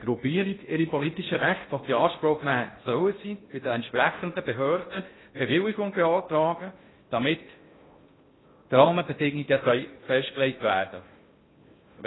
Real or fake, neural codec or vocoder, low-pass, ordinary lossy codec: fake; codec, 16 kHz in and 24 kHz out, 0.6 kbps, FocalCodec, streaming, 2048 codes; 7.2 kHz; AAC, 16 kbps